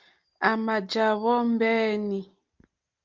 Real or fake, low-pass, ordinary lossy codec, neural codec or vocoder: real; 7.2 kHz; Opus, 24 kbps; none